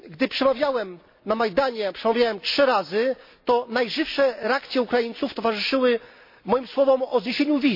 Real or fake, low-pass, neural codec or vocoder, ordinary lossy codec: real; 5.4 kHz; none; MP3, 32 kbps